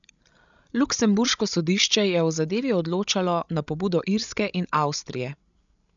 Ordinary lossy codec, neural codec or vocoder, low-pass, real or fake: none; codec, 16 kHz, 16 kbps, FreqCodec, larger model; 7.2 kHz; fake